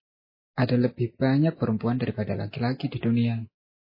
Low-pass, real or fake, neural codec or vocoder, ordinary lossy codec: 5.4 kHz; real; none; MP3, 24 kbps